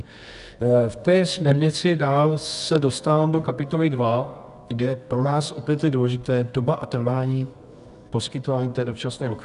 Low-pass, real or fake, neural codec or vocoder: 10.8 kHz; fake; codec, 24 kHz, 0.9 kbps, WavTokenizer, medium music audio release